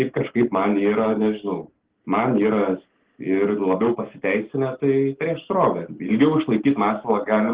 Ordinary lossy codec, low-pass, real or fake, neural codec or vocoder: Opus, 16 kbps; 3.6 kHz; real; none